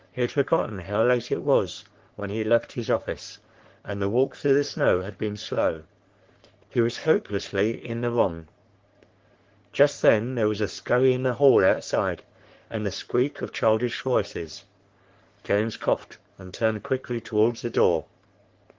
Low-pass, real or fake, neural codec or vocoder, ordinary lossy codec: 7.2 kHz; fake; codec, 44.1 kHz, 3.4 kbps, Pupu-Codec; Opus, 16 kbps